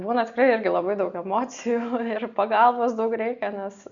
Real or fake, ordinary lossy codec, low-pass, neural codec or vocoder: real; Opus, 64 kbps; 7.2 kHz; none